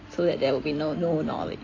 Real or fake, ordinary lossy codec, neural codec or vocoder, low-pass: real; AAC, 32 kbps; none; 7.2 kHz